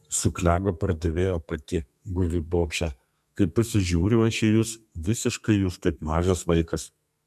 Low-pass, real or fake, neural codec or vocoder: 14.4 kHz; fake; codec, 32 kHz, 1.9 kbps, SNAC